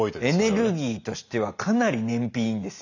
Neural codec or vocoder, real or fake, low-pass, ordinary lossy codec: none; real; 7.2 kHz; none